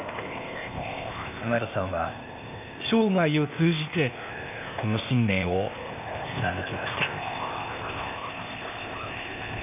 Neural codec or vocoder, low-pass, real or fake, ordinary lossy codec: codec, 16 kHz, 0.8 kbps, ZipCodec; 3.6 kHz; fake; none